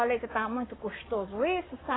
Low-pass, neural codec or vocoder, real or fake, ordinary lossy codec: 7.2 kHz; codec, 44.1 kHz, 7.8 kbps, Pupu-Codec; fake; AAC, 16 kbps